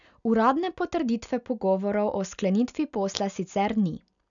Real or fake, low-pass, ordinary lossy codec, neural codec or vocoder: real; 7.2 kHz; none; none